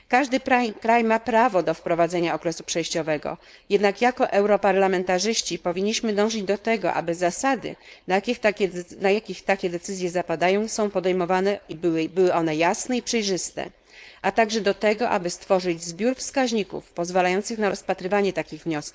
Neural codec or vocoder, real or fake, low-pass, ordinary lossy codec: codec, 16 kHz, 4.8 kbps, FACodec; fake; none; none